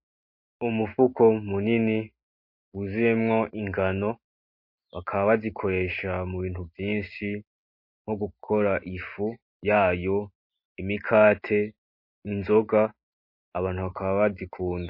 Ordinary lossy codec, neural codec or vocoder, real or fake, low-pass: MP3, 32 kbps; none; real; 5.4 kHz